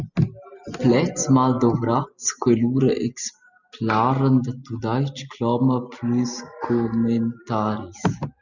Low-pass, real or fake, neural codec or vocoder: 7.2 kHz; real; none